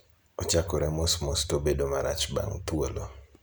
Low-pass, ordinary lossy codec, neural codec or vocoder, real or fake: none; none; none; real